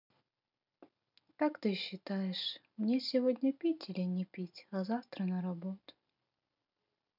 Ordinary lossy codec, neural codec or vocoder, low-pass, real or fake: none; none; 5.4 kHz; real